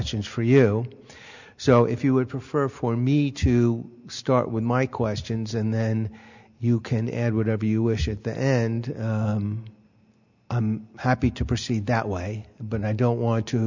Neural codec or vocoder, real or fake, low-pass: none; real; 7.2 kHz